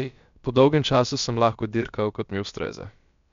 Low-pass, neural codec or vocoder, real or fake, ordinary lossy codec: 7.2 kHz; codec, 16 kHz, about 1 kbps, DyCAST, with the encoder's durations; fake; MP3, 64 kbps